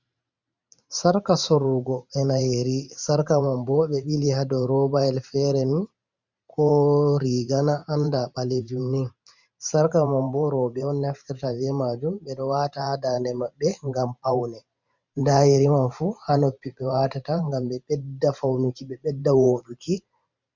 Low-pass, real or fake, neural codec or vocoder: 7.2 kHz; fake; vocoder, 44.1 kHz, 128 mel bands every 512 samples, BigVGAN v2